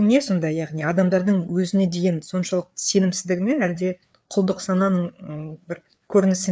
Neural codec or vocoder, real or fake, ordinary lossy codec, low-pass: codec, 16 kHz, 4 kbps, FreqCodec, larger model; fake; none; none